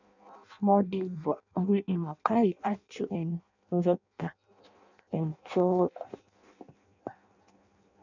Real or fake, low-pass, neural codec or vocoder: fake; 7.2 kHz; codec, 16 kHz in and 24 kHz out, 0.6 kbps, FireRedTTS-2 codec